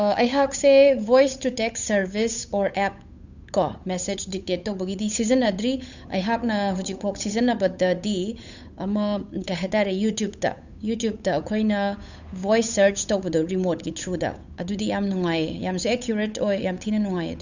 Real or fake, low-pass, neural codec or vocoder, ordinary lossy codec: fake; 7.2 kHz; codec, 16 kHz, 8 kbps, FunCodec, trained on Chinese and English, 25 frames a second; none